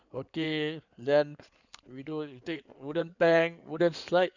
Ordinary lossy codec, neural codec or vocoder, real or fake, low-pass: none; codec, 16 kHz in and 24 kHz out, 2.2 kbps, FireRedTTS-2 codec; fake; 7.2 kHz